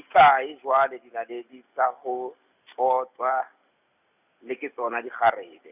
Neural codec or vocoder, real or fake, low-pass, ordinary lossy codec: none; real; 3.6 kHz; none